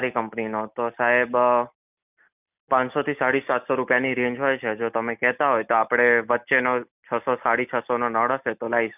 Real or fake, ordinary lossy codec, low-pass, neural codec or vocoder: real; none; 3.6 kHz; none